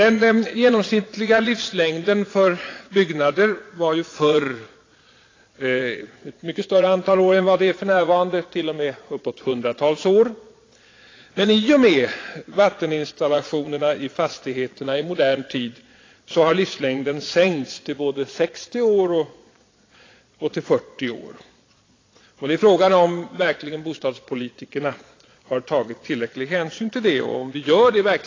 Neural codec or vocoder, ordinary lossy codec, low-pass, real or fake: vocoder, 22.05 kHz, 80 mel bands, Vocos; AAC, 32 kbps; 7.2 kHz; fake